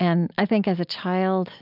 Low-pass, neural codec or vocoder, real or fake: 5.4 kHz; none; real